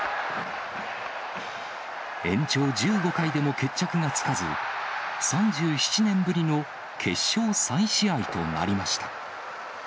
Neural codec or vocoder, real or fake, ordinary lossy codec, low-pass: none; real; none; none